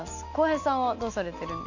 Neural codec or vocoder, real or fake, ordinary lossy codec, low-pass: none; real; none; 7.2 kHz